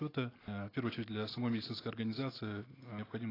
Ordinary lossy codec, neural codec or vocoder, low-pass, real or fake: AAC, 24 kbps; none; 5.4 kHz; real